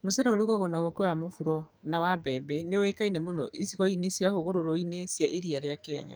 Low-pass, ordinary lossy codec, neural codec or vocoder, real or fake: none; none; codec, 44.1 kHz, 2.6 kbps, SNAC; fake